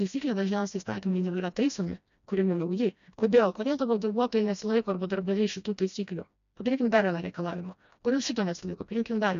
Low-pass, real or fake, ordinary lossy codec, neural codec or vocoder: 7.2 kHz; fake; MP3, 96 kbps; codec, 16 kHz, 1 kbps, FreqCodec, smaller model